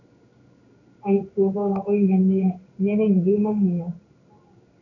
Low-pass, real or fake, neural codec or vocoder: 7.2 kHz; fake; codec, 16 kHz in and 24 kHz out, 1 kbps, XY-Tokenizer